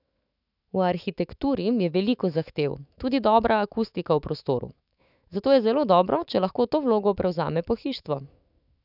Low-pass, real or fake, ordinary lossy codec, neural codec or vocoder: 5.4 kHz; fake; none; autoencoder, 48 kHz, 128 numbers a frame, DAC-VAE, trained on Japanese speech